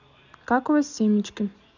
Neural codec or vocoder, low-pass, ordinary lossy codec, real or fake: none; 7.2 kHz; none; real